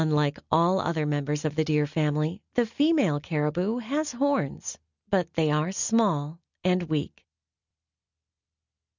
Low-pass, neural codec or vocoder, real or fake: 7.2 kHz; none; real